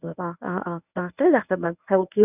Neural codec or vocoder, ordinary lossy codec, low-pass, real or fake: codec, 24 kHz, 0.9 kbps, WavTokenizer, medium speech release version 1; none; 3.6 kHz; fake